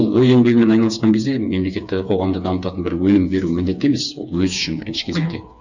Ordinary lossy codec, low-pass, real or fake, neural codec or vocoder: none; 7.2 kHz; fake; codec, 16 kHz, 4 kbps, FreqCodec, smaller model